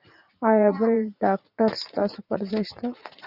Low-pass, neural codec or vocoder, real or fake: 5.4 kHz; vocoder, 44.1 kHz, 80 mel bands, Vocos; fake